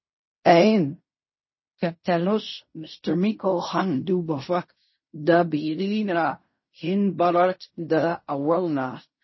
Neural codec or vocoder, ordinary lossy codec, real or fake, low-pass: codec, 16 kHz in and 24 kHz out, 0.4 kbps, LongCat-Audio-Codec, fine tuned four codebook decoder; MP3, 24 kbps; fake; 7.2 kHz